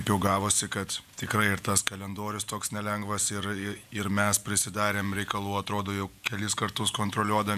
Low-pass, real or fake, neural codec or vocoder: 14.4 kHz; fake; vocoder, 48 kHz, 128 mel bands, Vocos